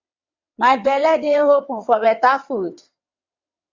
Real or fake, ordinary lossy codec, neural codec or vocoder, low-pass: fake; AAC, 48 kbps; vocoder, 22.05 kHz, 80 mel bands, WaveNeXt; 7.2 kHz